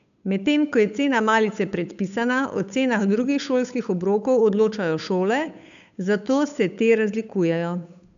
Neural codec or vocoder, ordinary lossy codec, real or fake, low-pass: codec, 16 kHz, 8 kbps, FunCodec, trained on Chinese and English, 25 frames a second; none; fake; 7.2 kHz